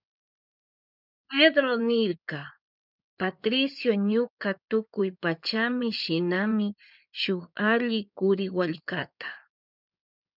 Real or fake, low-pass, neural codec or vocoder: fake; 5.4 kHz; codec, 16 kHz in and 24 kHz out, 2.2 kbps, FireRedTTS-2 codec